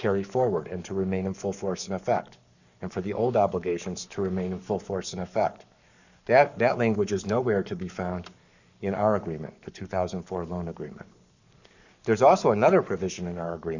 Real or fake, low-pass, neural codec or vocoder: fake; 7.2 kHz; codec, 44.1 kHz, 7.8 kbps, Pupu-Codec